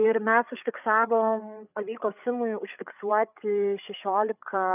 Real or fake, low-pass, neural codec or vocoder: fake; 3.6 kHz; codec, 16 kHz, 8 kbps, FreqCodec, larger model